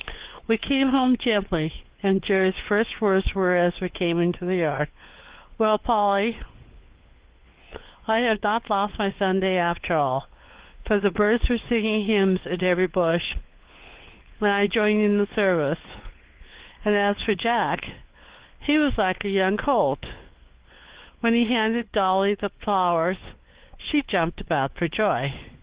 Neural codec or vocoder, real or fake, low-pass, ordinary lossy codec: codec, 16 kHz, 4 kbps, FunCodec, trained on LibriTTS, 50 frames a second; fake; 3.6 kHz; Opus, 16 kbps